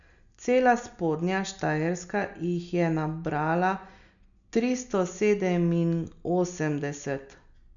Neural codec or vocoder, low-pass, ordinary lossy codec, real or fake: none; 7.2 kHz; none; real